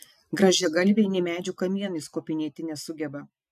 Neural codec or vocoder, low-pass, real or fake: none; 14.4 kHz; real